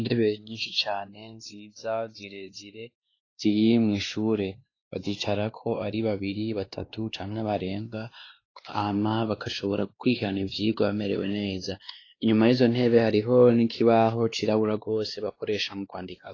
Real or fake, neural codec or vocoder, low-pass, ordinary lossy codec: fake; codec, 16 kHz, 2 kbps, X-Codec, WavLM features, trained on Multilingual LibriSpeech; 7.2 kHz; AAC, 32 kbps